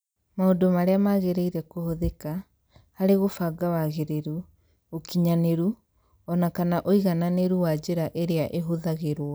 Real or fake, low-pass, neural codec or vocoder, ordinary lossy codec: real; none; none; none